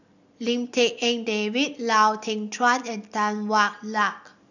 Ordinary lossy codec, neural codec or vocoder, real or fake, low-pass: none; none; real; 7.2 kHz